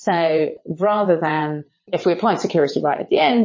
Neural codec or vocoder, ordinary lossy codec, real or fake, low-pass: vocoder, 22.05 kHz, 80 mel bands, WaveNeXt; MP3, 32 kbps; fake; 7.2 kHz